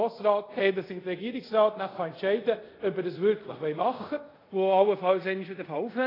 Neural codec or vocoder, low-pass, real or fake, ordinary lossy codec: codec, 24 kHz, 0.5 kbps, DualCodec; 5.4 kHz; fake; AAC, 24 kbps